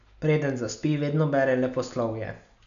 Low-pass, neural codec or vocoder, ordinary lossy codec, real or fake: 7.2 kHz; none; none; real